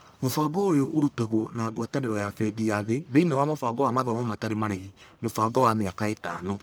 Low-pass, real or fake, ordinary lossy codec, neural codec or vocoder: none; fake; none; codec, 44.1 kHz, 1.7 kbps, Pupu-Codec